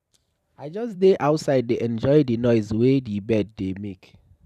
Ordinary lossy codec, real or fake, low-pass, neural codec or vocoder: none; fake; 14.4 kHz; vocoder, 44.1 kHz, 128 mel bands every 512 samples, BigVGAN v2